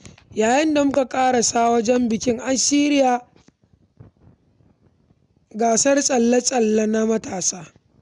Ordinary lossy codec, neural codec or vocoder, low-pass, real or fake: none; none; 10.8 kHz; real